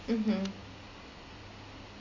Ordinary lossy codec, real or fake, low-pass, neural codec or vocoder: MP3, 48 kbps; real; 7.2 kHz; none